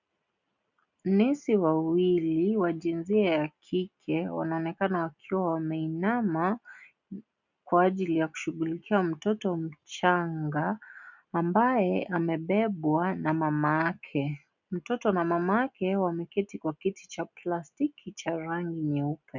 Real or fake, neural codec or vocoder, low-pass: real; none; 7.2 kHz